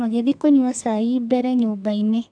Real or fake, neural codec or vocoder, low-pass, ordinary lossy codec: fake; codec, 32 kHz, 1.9 kbps, SNAC; 9.9 kHz; AAC, 64 kbps